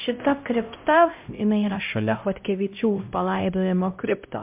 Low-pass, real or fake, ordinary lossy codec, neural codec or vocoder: 3.6 kHz; fake; MP3, 32 kbps; codec, 16 kHz, 0.5 kbps, X-Codec, HuBERT features, trained on LibriSpeech